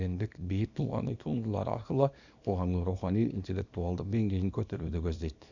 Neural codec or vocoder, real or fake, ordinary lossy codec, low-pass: codec, 24 kHz, 0.9 kbps, WavTokenizer, small release; fake; none; 7.2 kHz